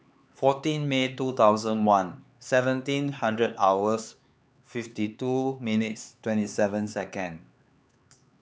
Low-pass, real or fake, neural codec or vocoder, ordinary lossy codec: none; fake; codec, 16 kHz, 4 kbps, X-Codec, HuBERT features, trained on LibriSpeech; none